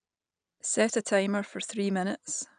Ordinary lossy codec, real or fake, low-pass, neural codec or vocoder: none; real; 9.9 kHz; none